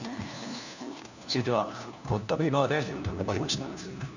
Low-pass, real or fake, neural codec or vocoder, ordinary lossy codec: 7.2 kHz; fake; codec, 16 kHz, 1 kbps, FunCodec, trained on LibriTTS, 50 frames a second; none